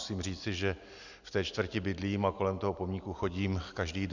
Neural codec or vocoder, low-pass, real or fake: none; 7.2 kHz; real